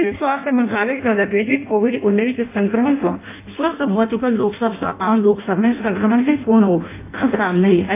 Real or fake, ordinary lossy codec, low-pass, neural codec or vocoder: fake; AAC, 24 kbps; 3.6 kHz; codec, 16 kHz in and 24 kHz out, 0.6 kbps, FireRedTTS-2 codec